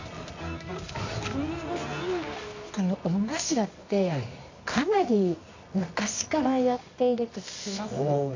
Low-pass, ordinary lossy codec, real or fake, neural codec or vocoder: 7.2 kHz; AAC, 48 kbps; fake; codec, 24 kHz, 0.9 kbps, WavTokenizer, medium music audio release